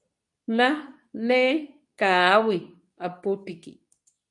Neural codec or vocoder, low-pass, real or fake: codec, 24 kHz, 0.9 kbps, WavTokenizer, medium speech release version 2; 10.8 kHz; fake